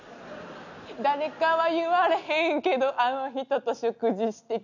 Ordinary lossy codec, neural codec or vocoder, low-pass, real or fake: none; none; 7.2 kHz; real